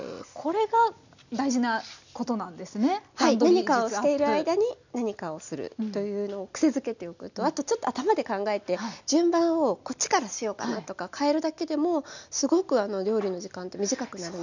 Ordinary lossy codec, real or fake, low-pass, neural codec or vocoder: none; real; 7.2 kHz; none